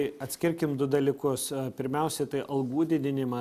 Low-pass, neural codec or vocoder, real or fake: 14.4 kHz; none; real